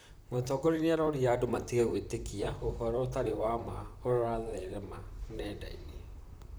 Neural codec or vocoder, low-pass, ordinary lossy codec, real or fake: vocoder, 44.1 kHz, 128 mel bands, Pupu-Vocoder; none; none; fake